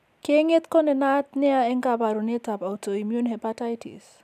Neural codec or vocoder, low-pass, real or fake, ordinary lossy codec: none; 14.4 kHz; real; none